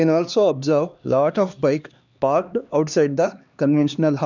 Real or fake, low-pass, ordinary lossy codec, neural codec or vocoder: fake; 7.2 kHz; none; codec, 16 kHz, 2 kbps, X-Codec, HuBERT features, trained on LibriSpeech